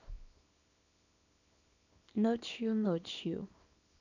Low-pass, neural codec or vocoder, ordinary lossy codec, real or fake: 7.2 kHz; codec, 24 kHz, 0.9 kbps, WavTokenizer, small release; none; fake